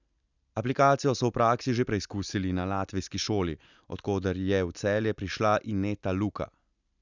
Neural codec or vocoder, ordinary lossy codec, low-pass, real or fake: none; none; 7.2 kHz; real